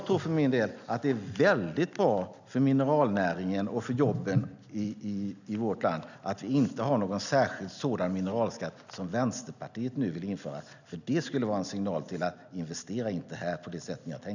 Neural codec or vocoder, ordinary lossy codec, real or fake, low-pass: none; none; real; 7.2 kHz